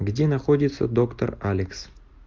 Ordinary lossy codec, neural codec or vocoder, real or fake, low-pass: Opus, 24 kbps; none; real; 7.2 kHz